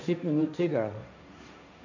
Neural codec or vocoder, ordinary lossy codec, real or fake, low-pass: codec, 16 kHz, 1.1 kbps, Voila-Tokenizer; none; fake; 7.2 kHz